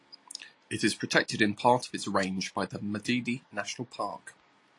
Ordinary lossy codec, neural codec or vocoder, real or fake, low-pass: AAC, 48 kbps; none; real; 10.8 kHz